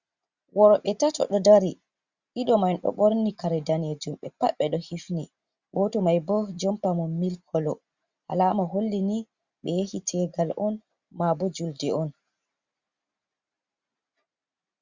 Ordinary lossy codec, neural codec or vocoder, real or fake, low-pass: Opus, 64 kbps; none; real; 7.2 kHz